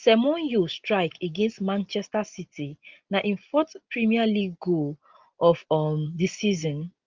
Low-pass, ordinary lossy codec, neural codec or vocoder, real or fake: 7.2 kHz; Opus, 24 kbps; none; real